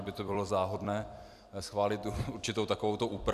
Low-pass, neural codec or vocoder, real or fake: 14.4 kHz; vocoder, 44.1 kHz, 128 mel bands every 256 samples, BigVGAN v2; fake